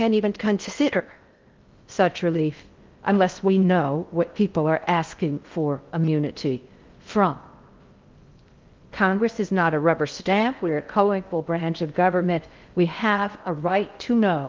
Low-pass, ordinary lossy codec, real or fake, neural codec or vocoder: 7.2 kHz; Opus, 32 kbps; fake; codec, 16 kHz in and 24 kHz out, 0.6 kbps, FocalCodec, streaming, 2048 codes